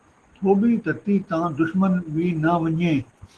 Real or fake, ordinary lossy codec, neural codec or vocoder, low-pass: real; Opus, 16 kbps; none; 10.8 kHz